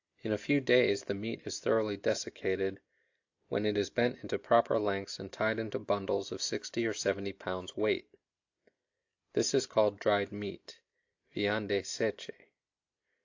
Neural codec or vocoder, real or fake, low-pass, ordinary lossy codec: none; real; 7.2 kHz; AAC, 48 kbps